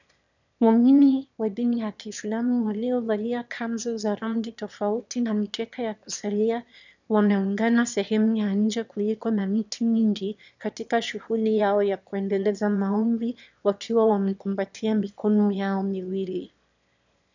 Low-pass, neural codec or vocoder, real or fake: 7.2 kHz; autoencoder, 22.05 kHz, a latent of 192 numbers a frame, VITS, trained on one speaker; fake